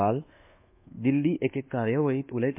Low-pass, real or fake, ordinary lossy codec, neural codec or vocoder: 3.6 kHz; fake; MP3, 32 kbps; codec, 24 kHz, 1.2 kbps, DualCodec